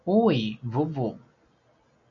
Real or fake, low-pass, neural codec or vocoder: real; 7.2 kHz; none